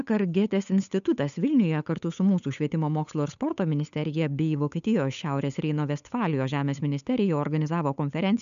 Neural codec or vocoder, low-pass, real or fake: codec, 16 kHz, 8 kbps, FunCodec, trained on Chinese and English, 25 frames a second; 7.2 kHz; fake